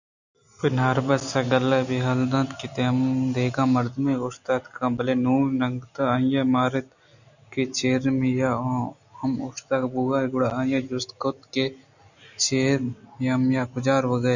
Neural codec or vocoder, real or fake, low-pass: none; real; 7.2 kHz